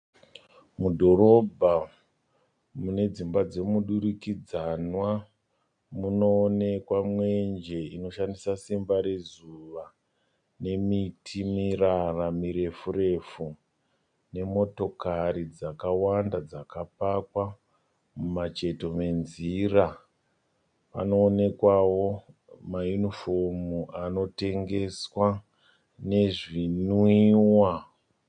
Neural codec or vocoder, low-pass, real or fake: none; 9.9 kHz; real